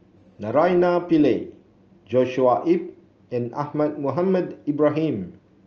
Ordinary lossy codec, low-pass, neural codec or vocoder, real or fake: Opus, 24 kbps; 7.2 kHz; none; real